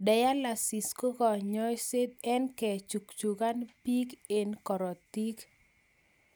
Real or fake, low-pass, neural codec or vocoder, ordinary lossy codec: real; none; none; none